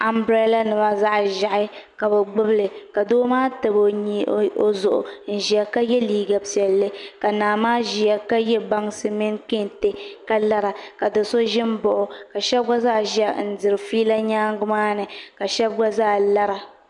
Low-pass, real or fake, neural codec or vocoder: 10.8 kHz; real; none